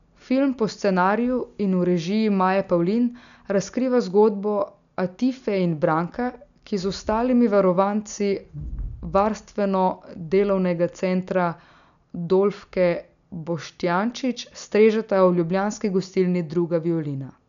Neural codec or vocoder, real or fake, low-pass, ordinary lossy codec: none; real; 7.2 kHz; none